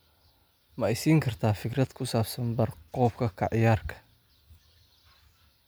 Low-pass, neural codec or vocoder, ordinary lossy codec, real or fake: none; none; none; real